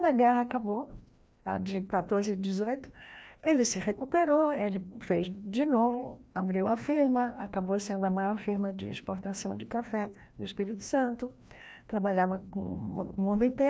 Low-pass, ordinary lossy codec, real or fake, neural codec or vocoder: none; none; fake; codec, 16 kHz, 1 kbps, FreqCodec, larger model